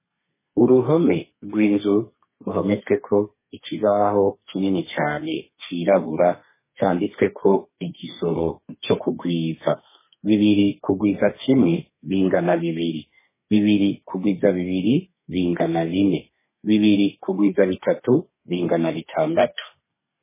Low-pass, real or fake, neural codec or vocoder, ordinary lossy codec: 3.6 kHz; fake; codec, 32 kHz, 1.9 kbps, SNAC; MP3, 16 kbps